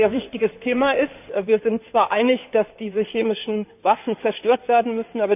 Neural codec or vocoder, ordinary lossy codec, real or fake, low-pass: codec, 16 kHz in and 24 kHz out, 2.2 kbps, FireRedTTS-2 codec; none; fake; 3.6 kHz